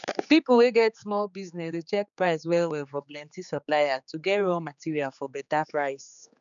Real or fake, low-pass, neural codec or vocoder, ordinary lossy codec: fake; 7.2 kHz; codec, 16 kHz, 4 kbps, X-Codec, HuBERT features, trained on general audio; none